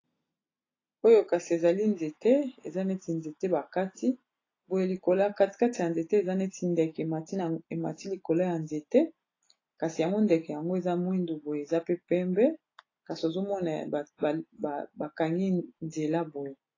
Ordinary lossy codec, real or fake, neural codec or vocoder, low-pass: AAC, 32 kbps; real; none; 7.2 kHz